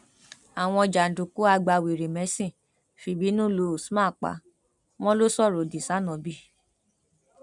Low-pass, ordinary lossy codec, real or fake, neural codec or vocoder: 10.8 kHz; none; real; none